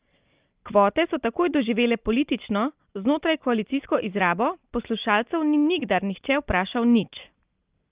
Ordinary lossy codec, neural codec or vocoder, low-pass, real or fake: Opus, 32 kbps; none; 3.6 kHz; real